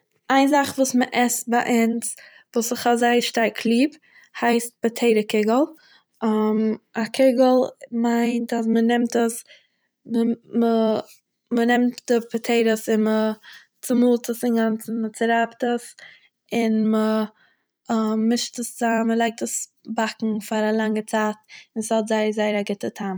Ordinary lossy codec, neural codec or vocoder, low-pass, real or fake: none; vocoder, 44.1 kHz, 128 mel bands every 256 samples, BigVGAN v2; none; fake